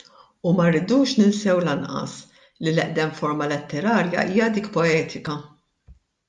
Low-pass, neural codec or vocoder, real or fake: 10.8 kHz; none; real